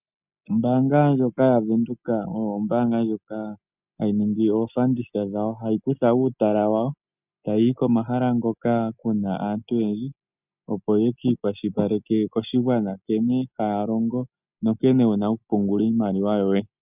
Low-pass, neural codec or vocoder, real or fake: 3.6 kHz; none; real